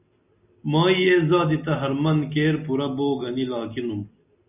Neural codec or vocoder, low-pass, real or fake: none; 3.6 kHz; real